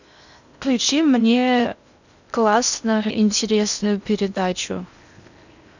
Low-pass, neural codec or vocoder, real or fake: 7.2 kHz; codec, 16 kHz in and 24 kHz out, 0.6 kbps, FocalCodec, streaming, 4096 codes; fake